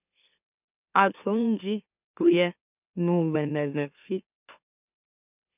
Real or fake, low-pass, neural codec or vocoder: fake; 3.6 kHz; autoencoder, 44.1 kHz, a latent of 192 numbers a frame, MeloTTS